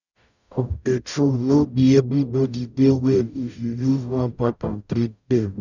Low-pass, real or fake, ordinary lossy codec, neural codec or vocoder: 7.2 kHz; fake; none; codec, 44.1 kHz, 0.9 kbps, DAC